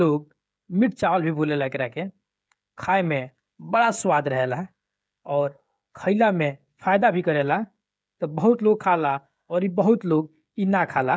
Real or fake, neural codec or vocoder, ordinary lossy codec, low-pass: fake; codec, 16 kHz, 16 kbps, FreqCodec, smaller model; none; none